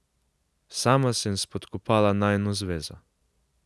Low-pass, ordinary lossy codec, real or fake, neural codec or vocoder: none; none; real; none